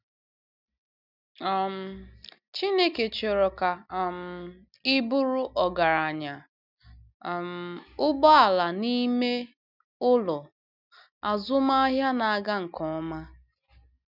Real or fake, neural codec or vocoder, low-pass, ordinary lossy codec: real; none; 5.4 kHz; none